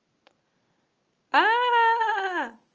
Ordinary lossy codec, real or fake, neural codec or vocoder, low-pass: Opus, 24 kbps; real; none; 7.2 kHz